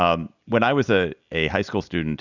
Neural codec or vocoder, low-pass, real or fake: none; 7.2 kHz; real